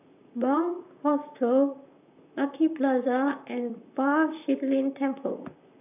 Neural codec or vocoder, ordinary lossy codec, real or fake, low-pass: vocoder, 44.1 kHz, 128 mel bands, Pupu-Vocoder; none; fake; 3.6 kHz